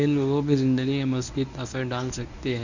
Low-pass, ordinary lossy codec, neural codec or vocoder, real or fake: 7.2 kHz; none; codec, 24 kHz, 0.9 kbps, WavTokenizer, medium speech release version 1; fake